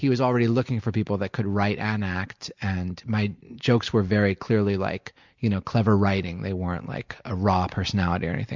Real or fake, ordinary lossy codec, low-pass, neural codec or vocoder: real; MP3, 64 kbps; 7.2 kHz; none